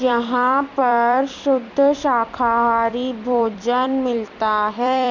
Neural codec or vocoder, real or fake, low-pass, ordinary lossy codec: vocoder, 44.1 kHz, 128 mel bands every 256 samples, BigVGAN v2; fake; 7.2 kHz; none